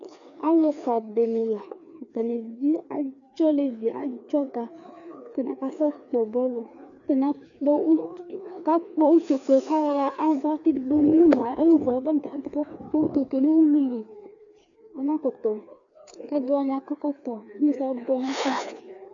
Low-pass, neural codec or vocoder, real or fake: 7.2 kHz; codec, 16 kHz, 2 kbps, FreqCodec, larger model; fake